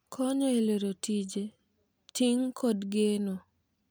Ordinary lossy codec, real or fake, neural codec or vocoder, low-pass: none; real; none; none